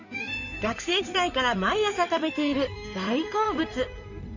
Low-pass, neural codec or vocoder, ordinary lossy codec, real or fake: 7.2 kHz; codec, 16 kHz in and 24 kHz out, 2.2 kbps, FireRedTTS-2 codec; none; fake